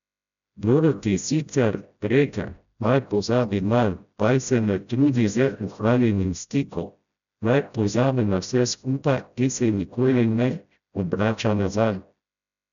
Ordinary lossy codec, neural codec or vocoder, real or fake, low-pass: none; codec, 16 kHz, 0.5 kbps, FreqCodec, smaller model; fake; 7.2 kHz